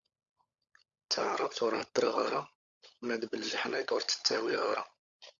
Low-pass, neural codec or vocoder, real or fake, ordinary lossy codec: 7.2 kHz; codec, 16 kHz, 16 kbps, FunCodec, trained on LibriTTS, 50 frames a second; fake; Opus, 64 kbps